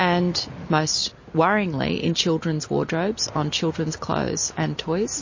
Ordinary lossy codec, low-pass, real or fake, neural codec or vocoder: MP3, 32 kbps; 7.2 kHz; real; none